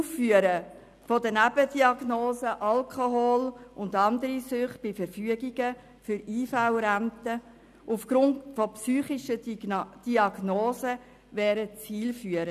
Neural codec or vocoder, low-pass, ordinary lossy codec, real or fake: none; 14.4 kHz; none; real